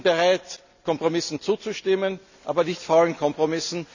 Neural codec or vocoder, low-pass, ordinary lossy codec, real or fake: none; 7.2 kHz; none; real